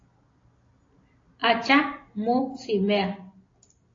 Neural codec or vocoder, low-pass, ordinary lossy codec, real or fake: none; 7.2 kHz; AAC, 32 kbps; real